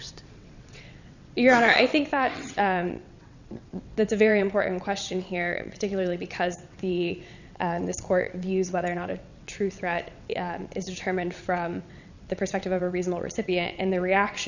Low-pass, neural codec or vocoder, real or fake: 7.2 kHz; vocoder, 22.05 kHz, 80 mel bands, WaveNeXt; fake